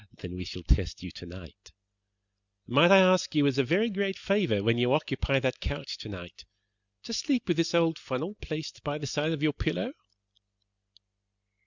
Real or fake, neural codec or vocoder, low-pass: real; none; 7.2 kHz